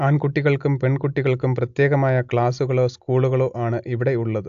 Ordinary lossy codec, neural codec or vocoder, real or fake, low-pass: AAC, 64 kbps; none; real; 7.2 kHz